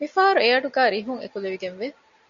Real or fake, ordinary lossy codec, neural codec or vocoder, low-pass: real; MP3, 96 kbps; none; 7.2 kHz